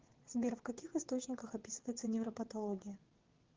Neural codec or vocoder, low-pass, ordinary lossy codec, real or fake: vocoder, 22.05 kHz, 80 mel bands, WaveNeXt; 7.2 kHz; Opus, 16 kbps; fake